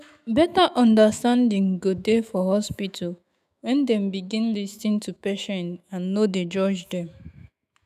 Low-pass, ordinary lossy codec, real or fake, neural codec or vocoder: 14.4 kHz; none; fake; autoencoder, 48 kHz, 128 numbers a frame, DAC-VAE, trained on Japanese speech